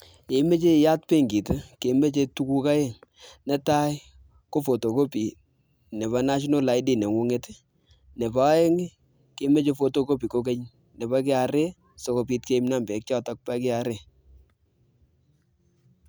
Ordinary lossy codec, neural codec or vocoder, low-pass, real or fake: none; none; none; real